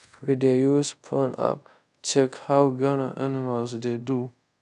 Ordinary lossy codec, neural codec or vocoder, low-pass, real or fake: none; codec, 24 kHz, 0.5 kbps, DualCodec; 10.8 kHz; fake